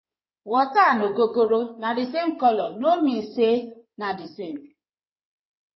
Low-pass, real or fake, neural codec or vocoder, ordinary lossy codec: 7.2 kHz; fake; codec, 16 kHz in and 24 kHz out, 2.2 kbps, FireRedTTS-2 codec; MP3, 24 kbps